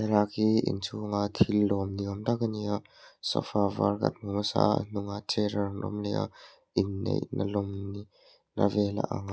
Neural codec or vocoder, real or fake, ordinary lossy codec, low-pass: none; real; none; none